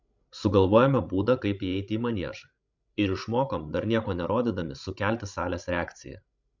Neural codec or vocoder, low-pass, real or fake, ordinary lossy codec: codec, 16 kHz, 16 kbps, FreqCodec, larger model; 7.2 kHz; fake; MP3, 64 kbps